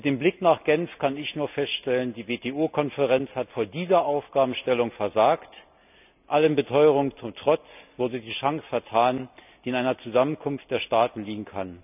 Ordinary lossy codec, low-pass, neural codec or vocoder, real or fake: none; 3.6 kHz; none; real